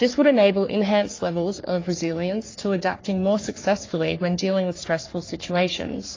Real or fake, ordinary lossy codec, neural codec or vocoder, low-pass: fake; AAC, 32 kbps; codec, 44.1 kHz, 3.4 kbps, Pupu-Codec; 7.2 kHz